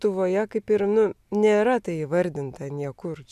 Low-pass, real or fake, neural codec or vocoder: 14.4 kHz; real; none